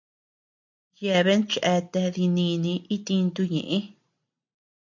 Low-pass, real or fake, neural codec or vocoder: 7.2 kHz; real; none